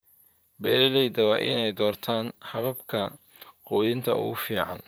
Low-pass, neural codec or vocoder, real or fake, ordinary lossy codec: none; vocoder, 44.1 kHz, 128 mel bands, Pupu-Vocoder; fake; none